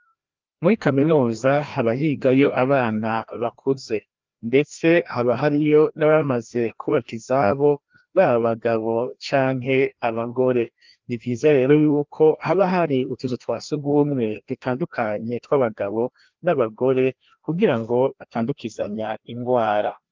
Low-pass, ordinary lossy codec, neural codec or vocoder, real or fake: 7.2 kHz; Opus, 24 kbps; codec, 16 kHz, 1 kbps, FreqCodec, larger model; fake